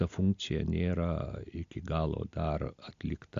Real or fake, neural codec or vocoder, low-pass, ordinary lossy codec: real; none; 7.2 kHz; AAC, 64 kbps